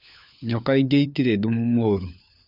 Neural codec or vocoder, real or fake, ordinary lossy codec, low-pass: codec, 16 kHz, 4 kbps, FunCodec, trained on LibriTTS, 50 frames a second; fake; none; 5.4 kHz